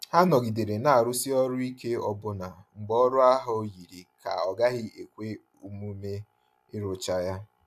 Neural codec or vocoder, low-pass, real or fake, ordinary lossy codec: none; 14.4 kHz; real; AAC, 96 kbps